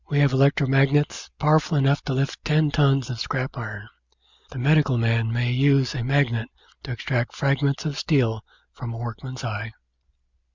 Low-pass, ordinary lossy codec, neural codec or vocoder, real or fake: 7.2 kHz; Opus, 64 kbps; none; real